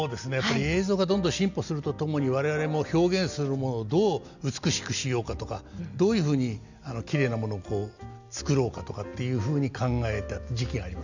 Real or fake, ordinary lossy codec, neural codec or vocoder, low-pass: real; none; none; 7.2 kHz